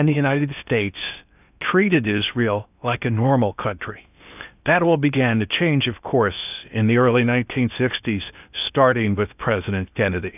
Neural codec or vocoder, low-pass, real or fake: codec, 16 kHz in and 24 kHz out, 0.8 kbps, FocalCodec, streaming, 65536 codes; 3.6 kHz; fake